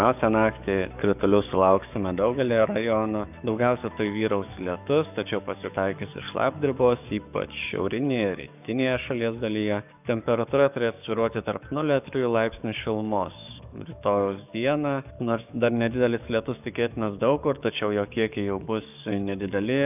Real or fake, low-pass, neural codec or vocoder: fake; 3.6 kHz; codec, 44.1 kHz, 7.8 kbps, DAC